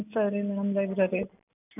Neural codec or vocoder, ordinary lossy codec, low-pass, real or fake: none; none; 3.6 kHz; real